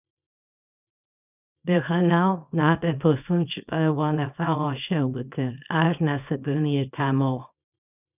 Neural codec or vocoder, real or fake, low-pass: codec, 24 kHz, 0.9 kbps, WavTokenizer, small release; fake; 3.6 kHz